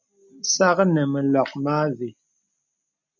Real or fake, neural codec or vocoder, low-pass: real; none; 7.2 kHz